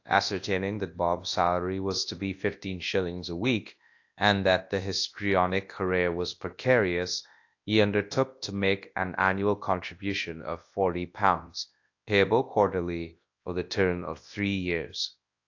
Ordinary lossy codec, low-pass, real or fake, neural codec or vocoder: AAC, 48 kbps; 7.2 kHz; fake; codec, 24 kHz, 0.9 kbps, WavTokenizer, large speech release